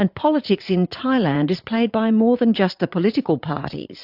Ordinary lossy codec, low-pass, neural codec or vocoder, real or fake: AAC, 48 kbps; 5.4 kHz; vocoder, 44.1 kHz, 80 mel bands, Vocos; fake